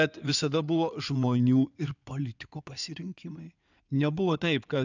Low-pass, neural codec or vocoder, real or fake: 7.2 kHz; codec, 16 kHz in and 24 kHz out, 2.2 kbps, FireRedTTS-2 codec; fake